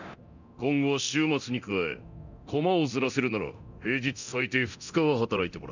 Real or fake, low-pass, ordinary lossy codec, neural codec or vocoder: fake; 7.2 kHz; none; codec, 24 kHz, 0.9 kbps, DualCodec